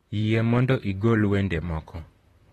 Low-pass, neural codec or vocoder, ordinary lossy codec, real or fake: 19.8 kHz; vocoder, 44.1 kHz, 128 mel bands, Pupu-Vocoder; AAC, 32 kbps; fake